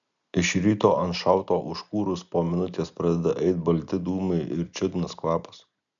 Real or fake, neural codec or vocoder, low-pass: real; none; 7.2 kHz